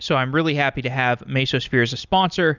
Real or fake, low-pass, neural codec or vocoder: real; 7.2 kHz; none